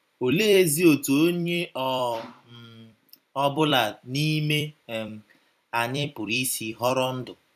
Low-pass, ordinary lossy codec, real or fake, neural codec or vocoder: 14.4 kHz; none; fake; vocoder, 44.1 kHz, 128 mel bands every 256 samples, BigVGAN v2